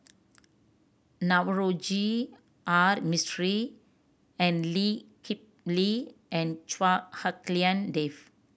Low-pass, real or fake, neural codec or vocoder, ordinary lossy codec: none; real; none; none